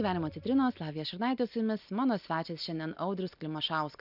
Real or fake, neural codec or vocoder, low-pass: real; none; 5.4 kHz